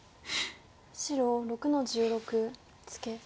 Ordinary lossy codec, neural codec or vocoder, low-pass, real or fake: none; none; none; real